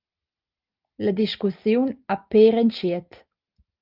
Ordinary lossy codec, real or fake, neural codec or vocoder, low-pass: Opus, 24 kbps; real; none; 5.4 kHz